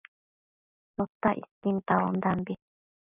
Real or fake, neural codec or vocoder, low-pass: real; none; 3.6 kHz